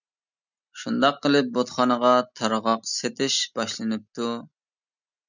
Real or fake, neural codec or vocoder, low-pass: real; none; 7.2 kHz